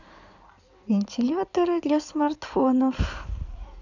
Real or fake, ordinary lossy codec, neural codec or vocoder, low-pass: fake; none; codec, 16 kHz in and 24 kHz out, 2.2 kbps, FireRedTTS-2 codec; 7.2 kHz